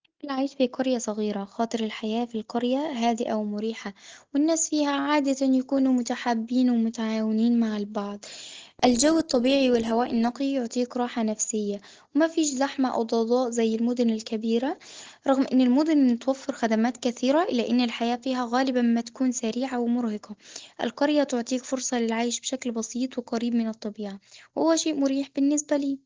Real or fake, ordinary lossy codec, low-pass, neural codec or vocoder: real; Opus, 16 kbps; 7.2 kHz; none